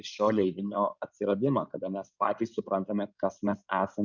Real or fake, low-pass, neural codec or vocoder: fake; 7.2 kHz; codec, 16 kHz in and 24 kHz out, 2.2 kbps, FireRedTTS-2 codec